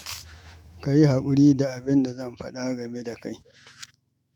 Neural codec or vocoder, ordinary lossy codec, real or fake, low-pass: autoencoder, 48 kHz, 128 numbers a frame, DAC-VAE, trained on Japanese speech; MP3, 96 kbps; fake; 19.8 kHz